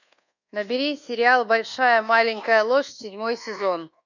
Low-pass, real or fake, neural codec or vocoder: 7.2 kHz; fake; codec, 24 kHz, 1.2 kbps, DualCodec